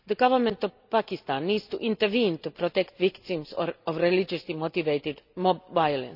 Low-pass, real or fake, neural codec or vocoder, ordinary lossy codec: 5.4 kHz; real; none; none